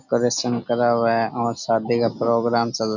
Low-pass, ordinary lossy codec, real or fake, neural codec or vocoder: 7.2 kHz; none; real; none